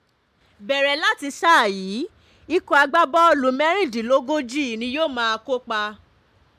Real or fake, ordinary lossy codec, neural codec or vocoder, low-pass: real; MP3, 96 kbps; none; 14.4 kHz